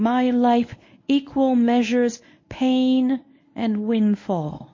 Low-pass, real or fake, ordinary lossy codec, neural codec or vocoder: 7.2 kHz; fake; MP3, 32 kbps; codec, 24 kHz, 0.9 kbps, WavTokenizer, medium speech release version 1